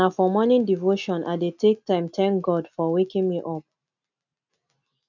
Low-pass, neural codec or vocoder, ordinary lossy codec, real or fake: 7.2 kHz; none; none; real